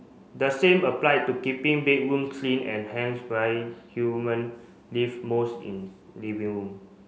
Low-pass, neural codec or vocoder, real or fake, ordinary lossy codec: none; none; real; none